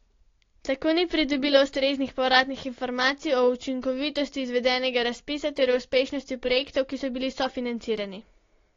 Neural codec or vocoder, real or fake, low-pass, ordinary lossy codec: none; real; 7.2 kHz; AAC, 32 kbps